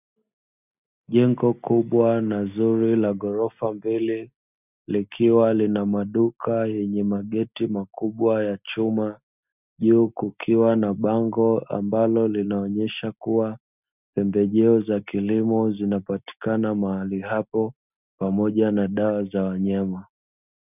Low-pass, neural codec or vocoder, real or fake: 3.6 kHz; none; real